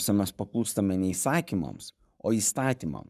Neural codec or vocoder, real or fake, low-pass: codec, 44.1 kHz, 7.8 kbps, Pupu-Codec; fake; 14.4 kHz